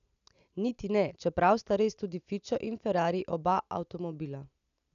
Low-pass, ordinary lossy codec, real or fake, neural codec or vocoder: 7.2 kHz; none; real; none